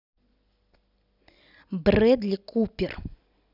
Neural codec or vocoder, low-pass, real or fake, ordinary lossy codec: none; 5.4 kHz; real; none